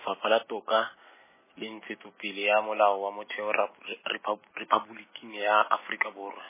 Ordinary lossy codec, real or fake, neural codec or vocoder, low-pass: MP3, 16 kbps; real; none; 3.6 kHz